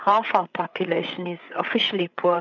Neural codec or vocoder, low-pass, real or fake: codec, 16 kHz, 8 kbps, FreqCodec, larger model; 7.2 kHz; fake